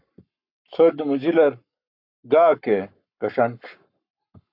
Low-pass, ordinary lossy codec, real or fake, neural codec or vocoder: 5.4 kHz; AAC, 48 kbps; fake; codec, 44.1 kHz, 7.8 kbps, Pupu-Codec